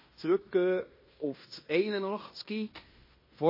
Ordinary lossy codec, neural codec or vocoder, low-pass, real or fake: MP3, 24 kbps; codec, 16 kHz in and 24 kHz out, 0.9 kbps, LongCat-Audio-Codec, fine tuned four codebook decoder; 5.4 kHz; fake